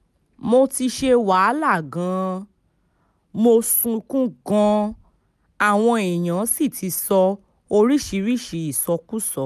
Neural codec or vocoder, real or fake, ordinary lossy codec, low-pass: none; real; none; 14.4 kHz